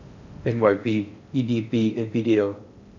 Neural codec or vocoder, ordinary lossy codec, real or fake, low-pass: codec, 16 kHz in and 24 kHz out, 0.6 kbps, FocalCodec, streaming, 4096 codes; none; fake; 7.2 kHz